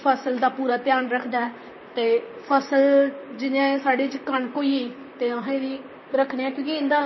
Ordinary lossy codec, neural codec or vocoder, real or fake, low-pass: MP3, 24 kbps; vocoder, 44.1 kHz, 128 mel bands, Pupu-Vocoder; fake; 7.2 kHz